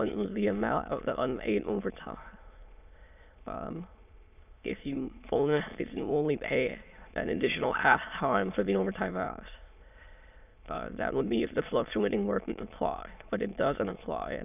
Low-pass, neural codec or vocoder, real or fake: 3.6 kHz; autoencoder, 22.05 kHz, a latent of 192 numbers a frame, VITS, trained on many speakers; fake